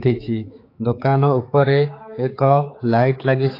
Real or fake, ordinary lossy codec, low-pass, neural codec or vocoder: fake; AAC, 32 kbps; 5.4 kHz; codec, 16 kHz, 4 kbps, X-Codec, HuBERT features, trained on general audio